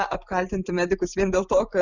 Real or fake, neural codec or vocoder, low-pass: real; none; 7.2 kHz